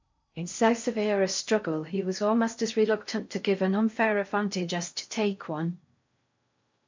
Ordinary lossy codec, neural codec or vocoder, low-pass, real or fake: MP3, 64 kbps; codec, 16 kHz in and 24 kHz out, 0.6 kbps, FocalCodec, streaming, 4096 codes; 7.2 kHz; fake